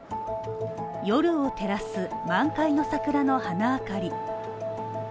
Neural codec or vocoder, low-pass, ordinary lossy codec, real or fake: none; none; none; real